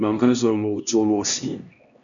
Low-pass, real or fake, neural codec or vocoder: 7.2 kHz; fake; codec, 16 kHz, 1 kbps, X-Codec, HuBERT features, trained on LibriSpeech